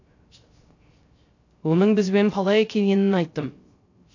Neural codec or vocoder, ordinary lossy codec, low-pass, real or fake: codec, 16 kHz, 0.3 kbps, FocalCodec; AAC, 48 kbps; 7.2 kHz; fake